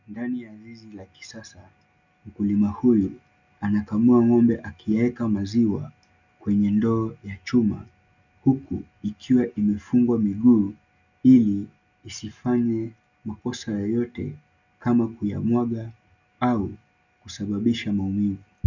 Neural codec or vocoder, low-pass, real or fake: none; 7.2 kHz; real